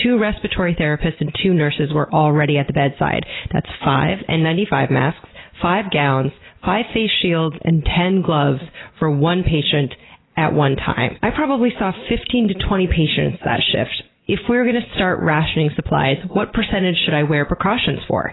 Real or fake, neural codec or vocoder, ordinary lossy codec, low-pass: real; none; AAC, 16 kbps; 7.2 kHz